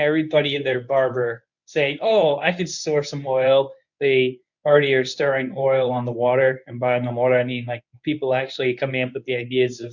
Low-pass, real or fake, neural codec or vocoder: 7.2 kHz; fake; codec, 24 kHz, 0.9 kbps, WavTokenizer, medium speech release version 1